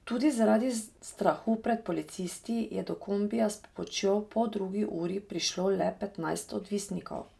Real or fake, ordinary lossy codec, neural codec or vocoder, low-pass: real; none; none; none